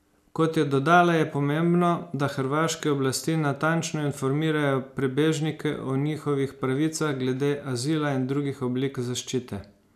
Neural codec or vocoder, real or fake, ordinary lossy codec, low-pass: none; real; none; 14.4 kHz